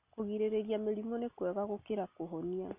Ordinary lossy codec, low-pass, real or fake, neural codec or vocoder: none; 3.6 kHz; real; none